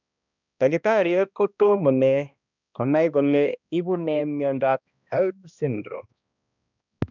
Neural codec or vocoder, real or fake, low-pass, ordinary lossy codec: codec, 16 kHz, 1 kbps, X-Codec, HuBERT features, trained on balanced general audio; fake; 7.2 kHz; none